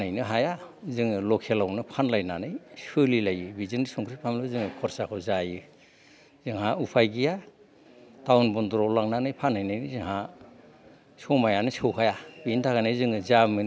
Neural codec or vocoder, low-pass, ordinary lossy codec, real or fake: none; none; none; real